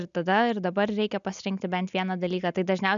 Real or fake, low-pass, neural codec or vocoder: real; 7.2 kHz; none